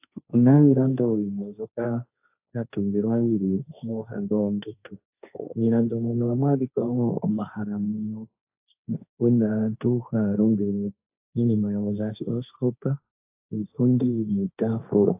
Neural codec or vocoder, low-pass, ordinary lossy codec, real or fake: codec, 16 kHz, 1.1 kbps, Voila-Tokenizer; 3.6 kHz; AAC, 32 kbps; fake